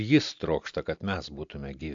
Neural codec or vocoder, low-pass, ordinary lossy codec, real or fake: none; 7.2 kHz; AAC, 64 kbps; real